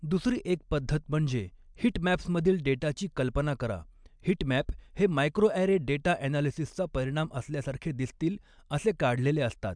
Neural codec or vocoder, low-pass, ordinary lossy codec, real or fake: none; 9.9 kHz; MP3, 96 kbps; real